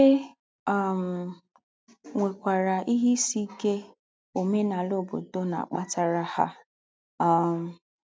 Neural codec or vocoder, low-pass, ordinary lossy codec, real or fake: none; none; none; real